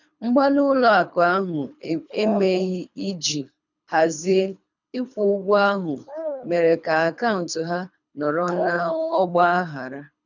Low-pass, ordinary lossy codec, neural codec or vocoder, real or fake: 7.2 kHz; none; codec, 24 kHz, 3 kbps, HILCodec; fake